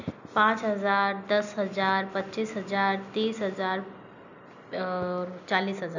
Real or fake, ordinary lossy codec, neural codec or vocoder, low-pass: real; none; none; 7.2 kHz